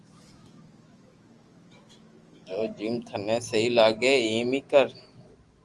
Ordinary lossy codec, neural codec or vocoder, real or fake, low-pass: Opus, 24 kbps; autoencoder, 48 kHz, 128 numbers a frame, DAC-VAE, trained on Japanese speech; fake; 10.8 kHz